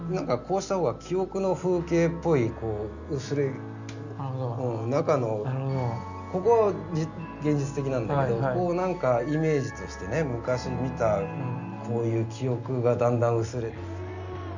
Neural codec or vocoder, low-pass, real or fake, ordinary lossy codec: none; 7.2 kHz; real; none